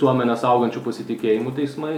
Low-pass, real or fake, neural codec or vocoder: 19.8 kHz; real; none